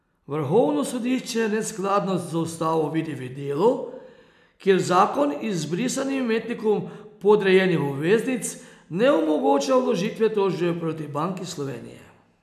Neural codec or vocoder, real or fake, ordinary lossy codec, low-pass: none; real; none; 14.4 kHz